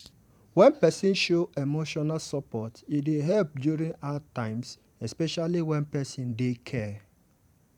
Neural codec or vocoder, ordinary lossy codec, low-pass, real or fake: vocoder, 44.1 kHz, 128 mel bands every 512 samples, BigVGAN v2; none; 19.8 kHz; fake